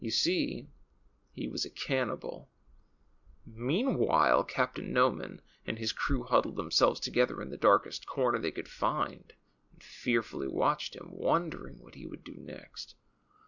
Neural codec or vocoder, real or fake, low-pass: none; real; 7.2 kHz